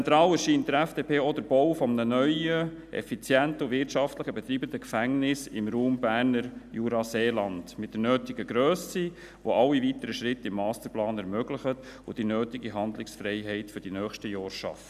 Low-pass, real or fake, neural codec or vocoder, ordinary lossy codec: 14.4 kHz; real; none; none